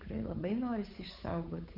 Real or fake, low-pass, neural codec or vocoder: fake; 5.4 kHz; codec, 16 kHz, 8 kbps, FunCodec, trained on Chinese and English, 25 frames a second